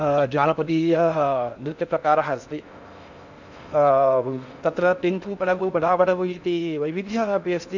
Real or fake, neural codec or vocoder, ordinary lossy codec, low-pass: fake; codec, 16 kHz in and 24 kHz out, 0.6 kbps, FocalCodec, streaming, 4096 codes; none; 7.2 kHz